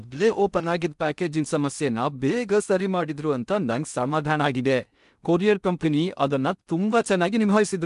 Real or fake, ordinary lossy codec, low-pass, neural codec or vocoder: fake; none; 10.8 kHz; codec, 16 kHz in and 24 kHz out, 0.6 kbps, FocalCodec, streaming, 2048 codes